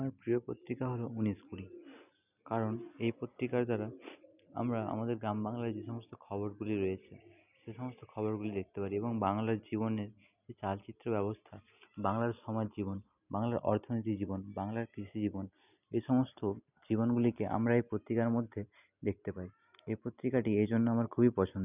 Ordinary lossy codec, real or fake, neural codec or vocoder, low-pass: none; real; none; 3.6 kHz